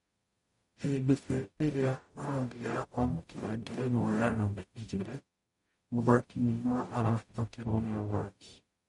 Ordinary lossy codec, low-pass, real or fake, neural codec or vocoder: MP3, 48 kbps; 19.8 kHz; fake; codec, 44.1 kHz, 0.9 kbps, DAC